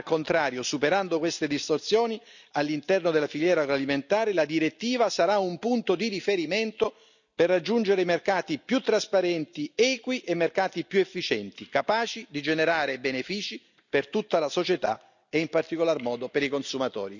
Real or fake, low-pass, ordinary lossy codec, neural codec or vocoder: real; 7.2 kHz; none; none